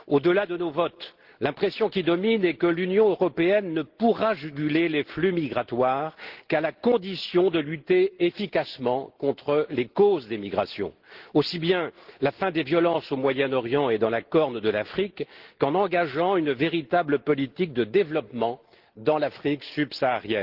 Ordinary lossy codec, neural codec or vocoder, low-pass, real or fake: Opus, 16 kbps; none; 5.4 kHz; real